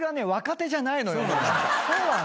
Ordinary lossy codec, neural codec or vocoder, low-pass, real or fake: none; none; none; real